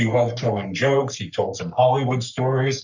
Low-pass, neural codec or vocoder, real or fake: 7.2 kHz; codec, 44.1 kHz, 3.4 kbps, Pupu-Codec; fake